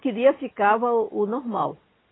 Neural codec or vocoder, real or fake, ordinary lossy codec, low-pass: none; real; AAC, 16 kbps; 7.2 kHz